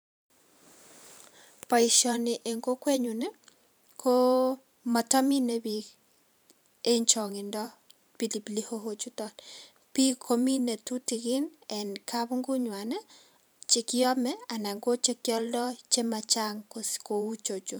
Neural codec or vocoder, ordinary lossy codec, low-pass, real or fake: vocoder, 44.1 kHz, 128 mel bands every 512 samples, BigVGAN v2; none; none; fake